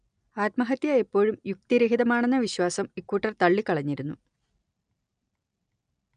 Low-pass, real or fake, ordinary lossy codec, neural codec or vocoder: 9.9 kHz; real; none; none